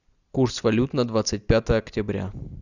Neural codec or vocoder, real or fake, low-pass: none; real; 7.2 kHz